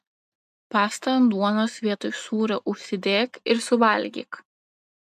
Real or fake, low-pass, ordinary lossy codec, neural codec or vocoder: real; 14.4 kHz; AAC, 96 kbps; none